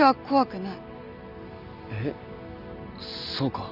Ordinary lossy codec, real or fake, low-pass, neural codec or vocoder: AAC, 48 kbps; real; 5.4 kHz; none